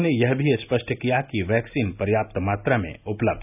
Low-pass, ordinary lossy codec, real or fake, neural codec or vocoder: 3.6 kHz; none; real; none